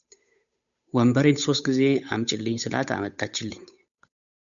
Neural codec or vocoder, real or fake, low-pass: codec, 16 kHz, 8 kbps, FunCodec, trained on Chinese and English, 25 frames a second; fake; 7.2 kHz